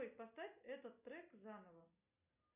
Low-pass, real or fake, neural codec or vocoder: 3.6 kHz; real; none